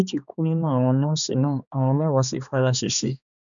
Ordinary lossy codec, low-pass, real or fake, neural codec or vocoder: none; 7.2 kHz; fake; codec, 16 kHz, 2 kbps, X-Codec, HuBERT features, trained on balanced general audio